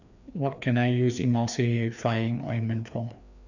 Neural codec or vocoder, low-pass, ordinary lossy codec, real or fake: codec, 16 kHz, 2 kbps, FreqCodec, larger model; 7.2 kHz; none; fake